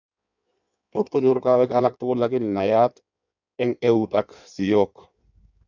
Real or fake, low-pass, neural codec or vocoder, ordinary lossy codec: fake; 7.2 kHz; codec, 16 kHz in and 24 kHz out, 1.1 kbps, FireRedTTS-2 codec; none